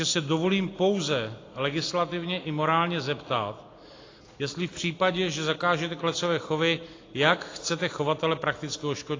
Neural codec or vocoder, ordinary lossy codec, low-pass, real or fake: none; AAC, 32 kbps; 7.2 kHz; real